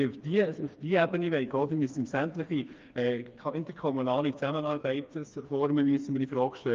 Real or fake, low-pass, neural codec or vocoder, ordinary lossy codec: fake; 7.2 kHz; codec, 16 kHz, 2 kbps, FreqCodec, smaller model; Opus, 32 kbps